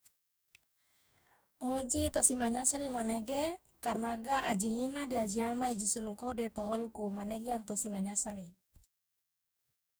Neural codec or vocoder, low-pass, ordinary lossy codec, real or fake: codec, 44.1 kHz, 2.6 kbps, DAC; none; none; fake